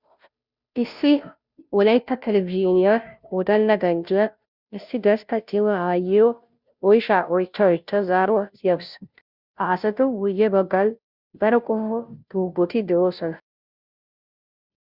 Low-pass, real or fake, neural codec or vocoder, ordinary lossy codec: 5.4 kHz; fake; codec, 16 kHz, 0.5 kbps, FunCodec, trained on Chinese and English, 25 frames a second; Opus, 64 kbps